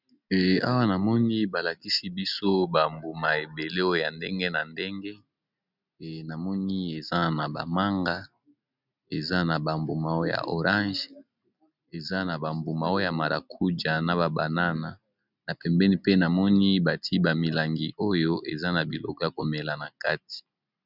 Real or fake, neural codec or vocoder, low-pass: real; none; 5.4 kHz